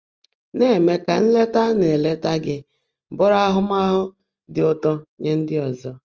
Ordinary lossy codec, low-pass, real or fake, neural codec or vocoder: Opus, 24 kbps; 7.2 kHz; real; none